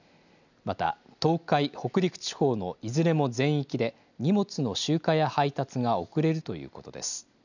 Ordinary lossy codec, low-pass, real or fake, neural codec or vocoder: none; 7.2 kHz; real; none